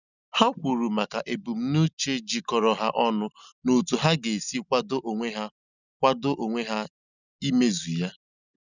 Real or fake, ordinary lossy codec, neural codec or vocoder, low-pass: real; none; none; 7.2 kHz